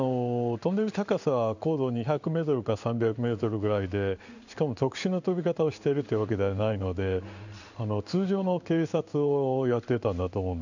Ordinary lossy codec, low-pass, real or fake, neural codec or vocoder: none; 7.2 kHz; fake; codec, 16 kHz in and 24 kHz out, 1 kbps, XY-Tokenizer